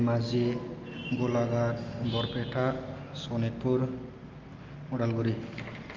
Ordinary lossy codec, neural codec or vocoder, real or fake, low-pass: Opus, 32 kbps; none; real; 7.2 kHz